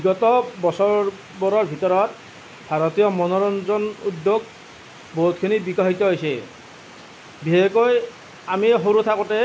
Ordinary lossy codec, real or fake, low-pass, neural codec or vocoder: none; real; none; none